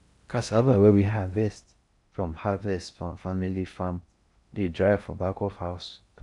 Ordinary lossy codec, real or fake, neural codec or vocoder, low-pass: none; fake; codec, 16 kHz in and 24 kHz out, 0.6 kbps, FocalCodec, streaming, 4096 codes; 10.8 kHz